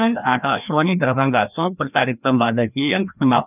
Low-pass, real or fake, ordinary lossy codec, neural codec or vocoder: 3.6 kHz; fake; none; codec, 16 kHz, 1 kbps, FreqCodec, larger model